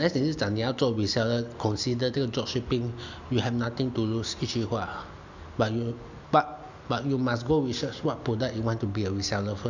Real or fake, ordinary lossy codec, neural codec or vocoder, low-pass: real; none; none; 7.2 kHz